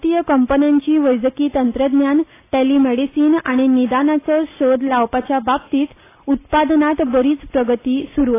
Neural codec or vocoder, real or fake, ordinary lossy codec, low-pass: none; real; AAC, 24 kbps; 3.6 kHz